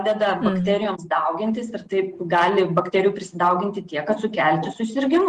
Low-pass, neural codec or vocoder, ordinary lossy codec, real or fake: 10.8 kHz; none; Opus, 24 kbps; real